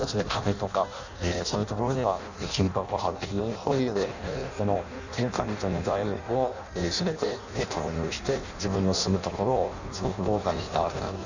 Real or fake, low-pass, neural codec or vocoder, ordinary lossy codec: fake; 7.2 kHz; codec, 16 kHz in and 24 kHz out, 0.6 kbps, FireRedTTS-2 codec; none